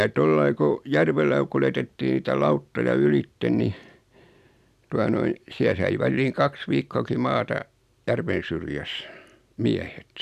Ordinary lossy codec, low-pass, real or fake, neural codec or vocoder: none; 14.4 kHz; real; none